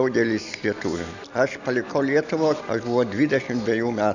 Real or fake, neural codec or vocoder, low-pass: real; none; 7.2 kHz